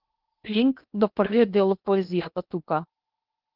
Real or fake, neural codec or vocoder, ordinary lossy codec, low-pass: fake; codec, 16 kHz in and 24 kHz out, 0.8 kbps, FocalCodec, streaming, 65536 codes; Opus, 24 kbps; 5.4 kHz